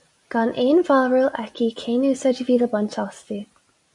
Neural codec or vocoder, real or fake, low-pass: none; real; 10.8 kHz